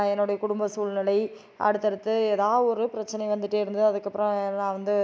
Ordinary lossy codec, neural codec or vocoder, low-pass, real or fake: none; none; none; real